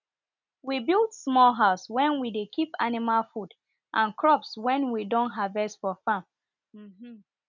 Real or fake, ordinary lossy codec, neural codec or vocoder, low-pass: real; none; none; 7.2 kHz